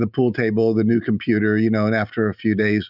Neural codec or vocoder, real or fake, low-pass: none; real; 5.4 kHz